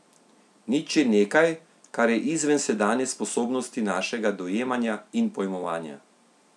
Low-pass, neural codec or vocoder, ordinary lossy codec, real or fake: none; none; none; real